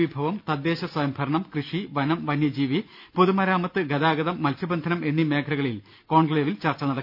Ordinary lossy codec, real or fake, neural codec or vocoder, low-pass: none; real; none; 5.4 kHz